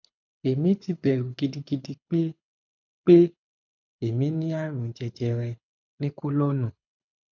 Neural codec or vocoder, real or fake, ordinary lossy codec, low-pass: codec, 24 kHz, 6 kbps, HILCodec; fake; none; 7.2 kHz